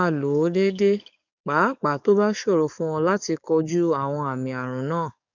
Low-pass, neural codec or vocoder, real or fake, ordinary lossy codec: 7.2 kHz; codec, 44.1 kHz, 7.8 kbps, DAC; fake; none